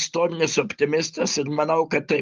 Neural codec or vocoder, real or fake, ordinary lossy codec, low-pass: none; real; Opus, 32 kbps; 7.2 kHz